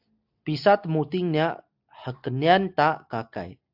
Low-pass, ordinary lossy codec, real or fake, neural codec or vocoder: 5.4 kHz; AAC, 48 kbps; real; none